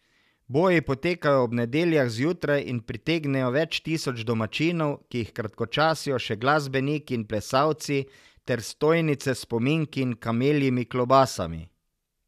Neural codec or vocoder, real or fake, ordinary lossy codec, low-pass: none; real; none; 14.4 kHz